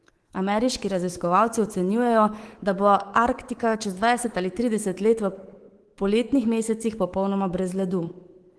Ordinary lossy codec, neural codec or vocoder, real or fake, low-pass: Opus, 16 kbps; codec, 24 kHz, 3.1 kbps, DualCodec; fake; 10.8 kHz